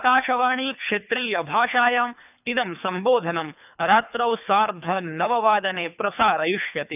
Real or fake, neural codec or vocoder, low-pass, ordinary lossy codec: fake; codec, 24 kHz, 3 kbps, HILCodec; 3.6 kHz; none